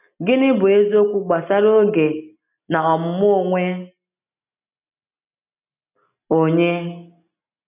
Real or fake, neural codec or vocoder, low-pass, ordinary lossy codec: real; none; 3.6 kHz; none